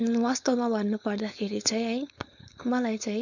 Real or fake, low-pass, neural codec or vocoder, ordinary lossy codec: fake; 7.2 kHz; codec, 16 kHz, 4.8 kbps, FACodec; none